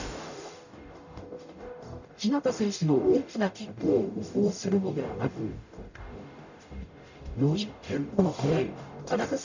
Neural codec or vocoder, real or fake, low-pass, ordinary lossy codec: codec, 44.1 kHz, 0.9 kbps, DAC; fake; 7.2 kHz; none